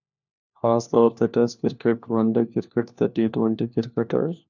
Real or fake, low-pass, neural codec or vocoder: fake; 7.2 kHz; codec, 16 kHz, 1 kbps, FunCodec, trained on LibriTTS, 50 frames a second